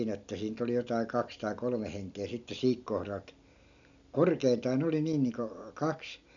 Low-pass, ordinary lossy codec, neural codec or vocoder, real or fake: 7.2 kHz; none; none; real